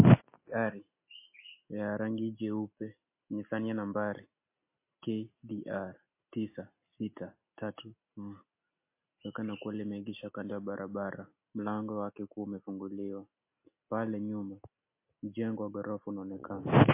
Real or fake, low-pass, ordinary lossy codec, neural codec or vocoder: real; 3.6 kHz; MP3, 24 kbps; none